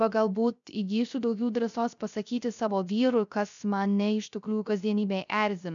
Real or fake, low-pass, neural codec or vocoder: fake; 7.2 kHz; codec, 16 kHz, 0.3 kbps, FocalCodec